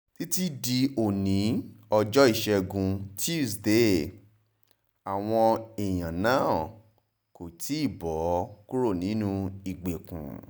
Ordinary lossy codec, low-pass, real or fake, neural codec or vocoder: none; none; real; none